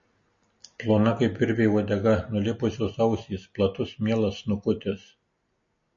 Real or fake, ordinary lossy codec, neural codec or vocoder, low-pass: real; MP3, 32 kbps; none; 7.2 kHz